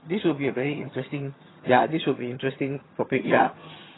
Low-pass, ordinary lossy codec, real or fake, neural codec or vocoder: 7.2 kHz; AAC, 16 kbps; fake; vocoder, 22.05 kHz, 80 mel bands, HiFi-GAN